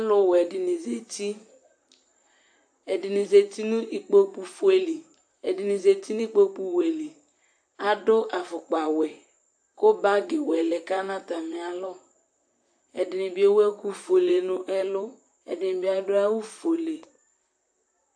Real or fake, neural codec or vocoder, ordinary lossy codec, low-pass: fake; vocoder, 44.1 kHz, 128 mel bands, Pupu-Vocoder; AAC, 64 kbps; 9.9 kHz